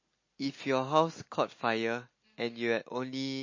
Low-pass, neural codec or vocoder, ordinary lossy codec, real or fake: 7.2 kHz; none; MP3, 32 kbps; real